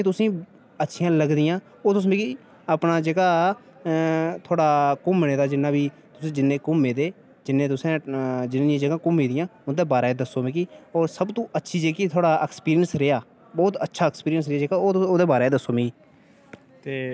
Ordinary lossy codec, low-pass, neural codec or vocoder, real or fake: none; none; none; real